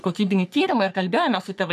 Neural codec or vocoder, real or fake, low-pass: codec, 44.1 kHz, 3.4 kbps, Pupu-Codec; fake; 14.4 kHz